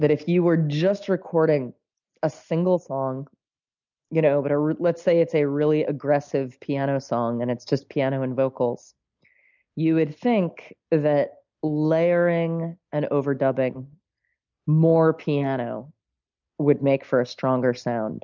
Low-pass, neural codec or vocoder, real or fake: 7.2 kHz; none; real